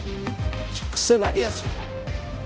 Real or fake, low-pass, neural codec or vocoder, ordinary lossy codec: fake; none; codec, 16 kHz, 0.5 kbps, X-Codec, HuBERT features, trained on balanced general audio; none